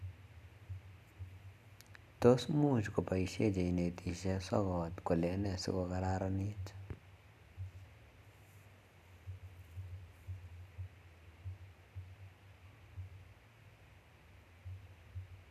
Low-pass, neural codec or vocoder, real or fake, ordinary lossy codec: 14.4 kHz; none; real; none